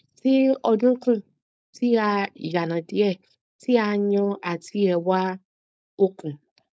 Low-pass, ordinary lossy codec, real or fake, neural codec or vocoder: none; none; fake; codec, 16 kHz, 4.8 kbps, FACodec